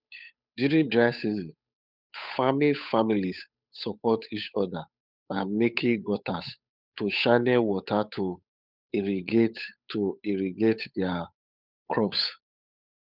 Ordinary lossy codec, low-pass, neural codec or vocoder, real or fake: none; 5.4 kHz; codec, 16 kHz, 8 kbps, FunCodec, trained on Chinese and English, 25 frames a second; fake